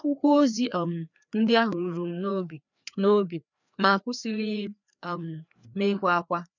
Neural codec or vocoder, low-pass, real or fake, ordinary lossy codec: codec, 16 kHz, 2 kbps, FreqCodec, larger model; 7.2 kHz; fake; none